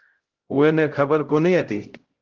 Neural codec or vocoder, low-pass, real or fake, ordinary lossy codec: codec, 16 kHz, 0.5 kbps, X-Codec, HuBERT features, trained on LibriSpeech; 7.2 kHz; fake; Opus, 16 kbps